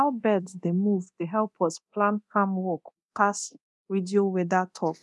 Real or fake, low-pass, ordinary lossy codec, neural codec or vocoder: fake; none; none; codec, 24 kHz, 0.9 kbps, DualCodec